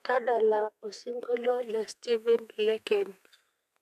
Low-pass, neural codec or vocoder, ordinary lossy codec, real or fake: 14.4 kHz; codec, 32 kHz, 1.9 kbps, SNAC; none; fake